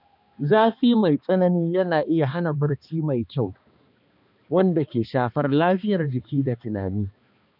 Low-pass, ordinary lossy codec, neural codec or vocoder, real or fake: 5.4 kHz; none; codec, 16 kHz, 2 kbps, X-Codec, HuBERT features, trained on balanced general audio; fake